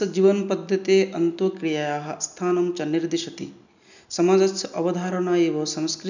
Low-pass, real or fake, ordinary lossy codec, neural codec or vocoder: 7.2 kHz; real; none; none